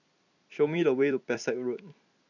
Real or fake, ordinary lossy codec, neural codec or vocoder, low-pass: fake; none; vocoder, 44.1 kHz, 128 mel bands every 256 samples, BigVGAN v2; 7.2 kHz